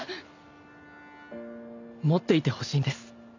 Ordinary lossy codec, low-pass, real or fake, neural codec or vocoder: none; 7.2 kHz; real; none